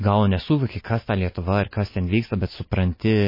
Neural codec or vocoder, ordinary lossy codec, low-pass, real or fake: none; MP3, 24 kbps; 5.4 kHz; real